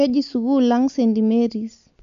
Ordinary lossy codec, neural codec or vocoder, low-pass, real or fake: AAC, 96 kbps; none; 7.2 kHz; real